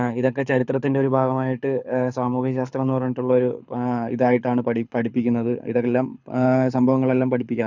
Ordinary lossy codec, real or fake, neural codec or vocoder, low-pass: none; fake; codec, 24 kHz, 6 kbps, HILCodec; 7.2 kHz